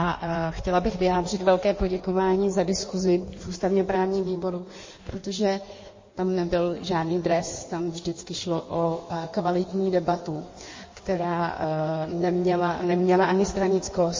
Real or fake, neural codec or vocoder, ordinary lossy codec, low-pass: fake; codec, 16 kHz in and 24 kHz out, 1.1 kbps, FireRedTTS-2 codec; MP3, 32 kbps; 7.2 kHz